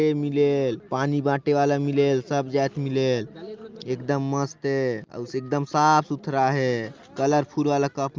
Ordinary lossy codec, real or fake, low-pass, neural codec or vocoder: Opus, 32 kbps; real; 7.2 kHz; none